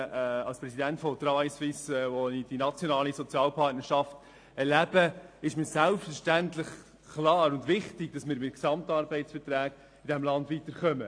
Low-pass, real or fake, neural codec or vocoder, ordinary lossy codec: 9.9 kHz; real; none; AAC, 48 kbps